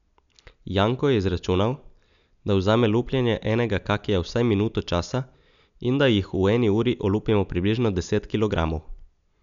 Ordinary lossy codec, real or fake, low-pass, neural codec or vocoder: none; real; 7.2 kHz; none